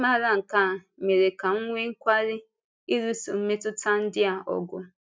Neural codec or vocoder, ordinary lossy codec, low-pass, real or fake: none; none; none; real